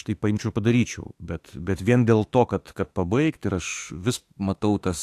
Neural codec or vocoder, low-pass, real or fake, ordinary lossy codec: autoencoder, 48 kHz, 32 numbers a frame, DAC-VAE, trained on Japanese speech; 14.4 kHz; fake; AAC, 64 kbps